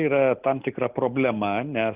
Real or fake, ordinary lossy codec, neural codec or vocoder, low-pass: real; Opus, 24 kbps; none; 3.6 kHz